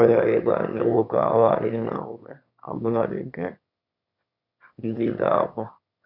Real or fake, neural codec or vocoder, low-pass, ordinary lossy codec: fake; autoencoder, 22.05 kHz, a latent of 192 numbers a frame, VITS, trained on one speaker; 5.4 kHz; none